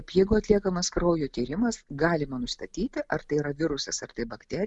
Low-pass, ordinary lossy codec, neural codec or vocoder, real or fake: 10.8 kHz; MP3, 96 kbps; none; real